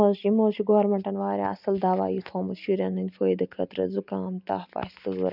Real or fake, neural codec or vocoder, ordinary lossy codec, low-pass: real; none; none; 5.4 kHz